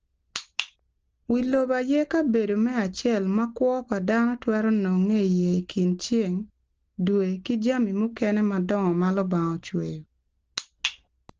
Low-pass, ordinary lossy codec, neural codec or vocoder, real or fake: 7.2 kHz; Opus, 16 kbps; none; real